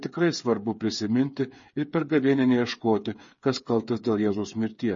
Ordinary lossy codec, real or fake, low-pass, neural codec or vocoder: MP3, 32 kbps; fake; 7.2 kHz; codec, 16 kHz, 8 kbps, FreqCodec, smaller model